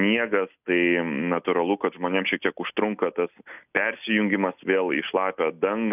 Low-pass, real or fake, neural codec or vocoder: 3.6 kHz; real; none